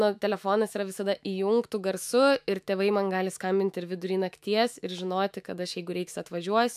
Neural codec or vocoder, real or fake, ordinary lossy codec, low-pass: autoencoder, 48 kHz, 128 numbers a frame, DAC-VAE, trained on Japanese speech; fake; AAC, 96 kbps; 14.4 kHz